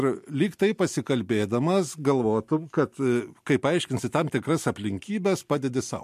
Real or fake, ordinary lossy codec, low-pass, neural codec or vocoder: real; MP3, 64 kbps; 14.4 kHz; none